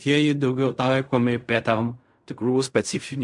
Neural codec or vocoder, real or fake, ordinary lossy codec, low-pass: codec, 16 kHz in and 24 kHz out, 0.4 kbps, LongCat-Audio-Codec, fine tuned four codebook decoder; fake; MP3, 64 kbps; 10.8 kHz